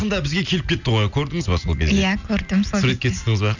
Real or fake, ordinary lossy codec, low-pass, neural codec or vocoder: real; none; 7.2 kHz; none